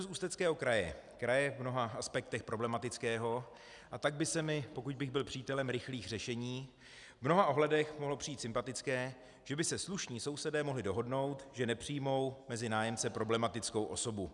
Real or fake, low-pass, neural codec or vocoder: real; 10.8 kHz; none